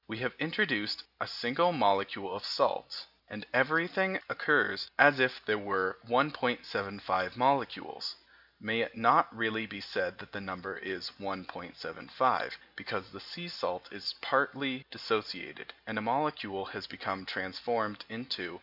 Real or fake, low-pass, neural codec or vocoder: real; 5.4 kHz; none